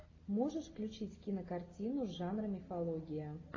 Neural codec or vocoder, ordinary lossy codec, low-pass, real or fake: none; AAC, 32 kbps; 7.2 kHz; real